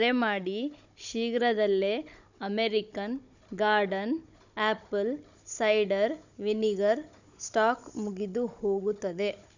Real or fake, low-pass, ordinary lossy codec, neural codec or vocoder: fake; 7.2 kHz; none; codec, 16 kHz, 16 kbps, FunCodec, trained on Chinese and English, 50 frames a second